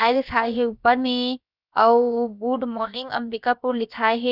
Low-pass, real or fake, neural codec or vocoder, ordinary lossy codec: 5.4 kHz; fake; codec, 16 kHz, about 1 kbps, DyCAST, with the encoder's durations; none